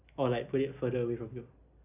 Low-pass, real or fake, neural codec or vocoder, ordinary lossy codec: 3.6 kHz; real; none; none